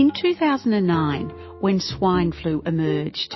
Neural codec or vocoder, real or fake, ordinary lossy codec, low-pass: autoencoder, 48 kHz, 128 numbers a frame, DAC-VAE, trained on Japanese speech; fake; MP3, 24 kbps; 7.2 kHz